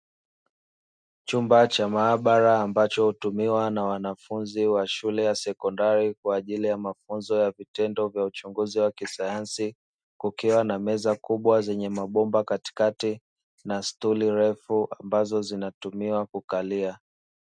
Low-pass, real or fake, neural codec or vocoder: 9.9 kHz; real; none